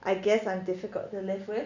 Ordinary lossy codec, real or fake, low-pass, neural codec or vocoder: none; real; 7.2 kHz; none